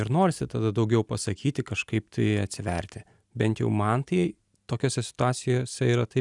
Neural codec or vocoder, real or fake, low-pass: none; real; 10.8 kHz